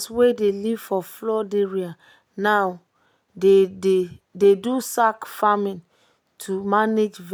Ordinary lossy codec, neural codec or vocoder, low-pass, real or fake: none; none; none; real